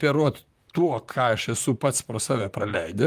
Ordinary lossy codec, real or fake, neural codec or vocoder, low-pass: Opus, 32 kbps; fake; vocoder, 44.1 kHz, 128 mel bands, Pupu-Vocoder; 14.4 kHz